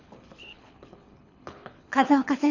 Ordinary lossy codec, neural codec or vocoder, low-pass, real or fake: none; codec, 24 kHz, 6 kbps, HILCodec; 7.2 kHz; fake